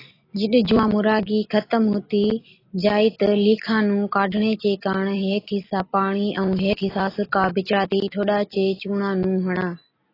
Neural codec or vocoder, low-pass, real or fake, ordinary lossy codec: none; 5.4 kHz; real; AAC, 24 kbps